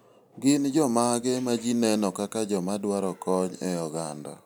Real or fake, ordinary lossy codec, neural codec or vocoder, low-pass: real; none; none; none